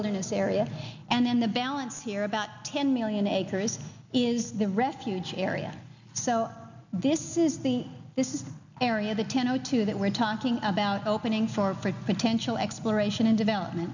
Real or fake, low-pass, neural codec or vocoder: real; 7.2 kHz; none